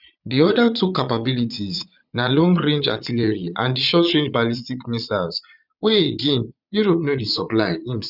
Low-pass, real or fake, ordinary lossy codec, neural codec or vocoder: 5.4 kHz; fake; none; vocoder, 22.05 kHz, 80 mel bands, Vocos